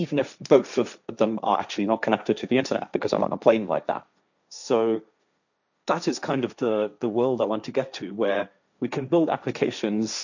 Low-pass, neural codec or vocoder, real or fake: 7.2 kHz; codec, 16 kHz, 1.1 kbps, Voila-Tokenizer; fake